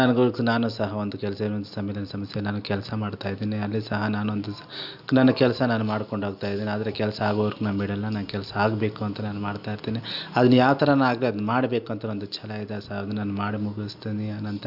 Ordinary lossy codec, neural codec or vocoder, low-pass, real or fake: none; none; 5.4 kHz; real